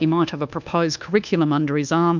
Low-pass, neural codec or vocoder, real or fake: 7.2 kHz; codec, 24 kHz, 1.2 kbps, DualCodec; fake